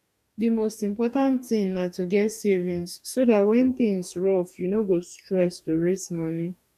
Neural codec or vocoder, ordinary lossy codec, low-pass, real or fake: codec, 44.1 kHz, 2.6 kbps, DAC; none; 14.4 kHz; fake